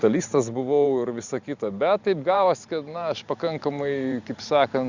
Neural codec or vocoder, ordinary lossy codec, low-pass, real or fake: vocoder, 44.1 kHz, 128 mel bands every 512 samples, BigVGAN v2; Opus, 64 kbps; 7.2 kHz; fake